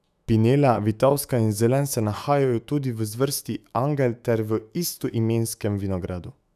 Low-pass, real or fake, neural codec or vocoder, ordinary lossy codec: 14.4 kHz; fake; autoencoder, 48 kHz, 128 numbers a frame, DAC-VAE, trained on Japanese speech; none